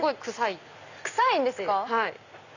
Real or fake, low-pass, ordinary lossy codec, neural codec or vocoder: real; 7.2 kHz; none; none